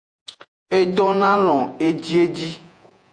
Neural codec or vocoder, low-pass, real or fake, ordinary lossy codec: vocoder, 48 kHz, 128 mel bands, Vocos; 9.9 kHz; fake; AAC, 64 kbps